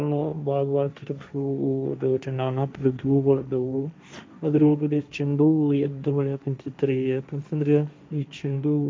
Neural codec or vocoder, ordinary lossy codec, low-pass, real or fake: codec, 16 kHz, 1.1 kbps, Voila-Tokenizer; none; none; fake